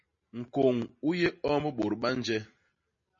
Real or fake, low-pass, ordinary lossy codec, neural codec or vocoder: real; 7.2 kHz; MP3, 32 kbps; none